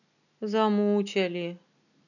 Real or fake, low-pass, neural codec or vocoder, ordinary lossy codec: real; 7.2 kHz; none; none